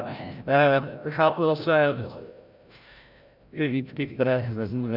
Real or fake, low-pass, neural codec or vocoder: fake; 5.4 kHz; codec, 16 kHz, 0.5 kbps, FreqCodec, larger model